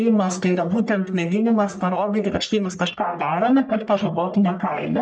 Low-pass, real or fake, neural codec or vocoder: 9.9 kHz; fake; codec, 44.1 kHz, 1.7 kbps, Pupu-Codec